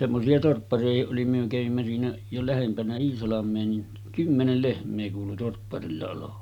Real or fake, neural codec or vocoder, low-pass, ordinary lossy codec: real; none; 19.8 kHz; none